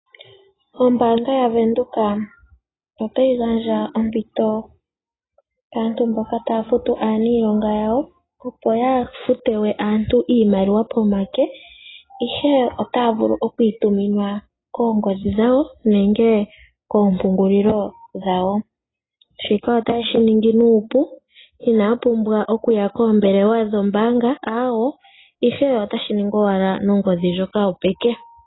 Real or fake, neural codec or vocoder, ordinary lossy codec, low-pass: real; none; AAC, 16 kbps; 7.2 kHz